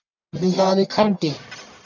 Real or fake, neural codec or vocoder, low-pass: fake; codec, 44.1 kHz, 1.7 kbps, Pupu-Codec; 7.2 kHz